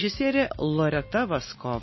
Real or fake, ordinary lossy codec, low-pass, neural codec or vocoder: real; MP3, 24 kbps; 7.2 kHz; none